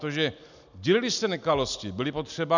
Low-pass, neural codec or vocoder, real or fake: 7.2 kHz; none; real